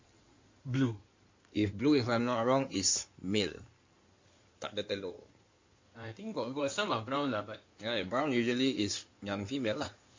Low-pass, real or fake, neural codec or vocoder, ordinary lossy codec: 7.2 kHz; fake; codec, 16 kHz in and 24 kHz out, 2.2 kbps, FireRedTTS-2 codec; MP3, 48 kbps